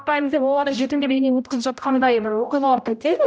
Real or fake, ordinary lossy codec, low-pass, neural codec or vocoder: fake; none; none; codec, 16 kHz, 0.5 kbps, X-Codec, HuBERT features, trained on general audio